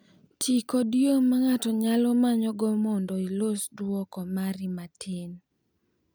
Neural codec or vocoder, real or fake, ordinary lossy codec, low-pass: none; real; none; none